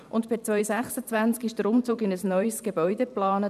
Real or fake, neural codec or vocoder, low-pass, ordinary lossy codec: fake; vocoder, 44.1 kHz, 128 mel bands every 512 samples, BigVGAN v2; 14.4 kHz; none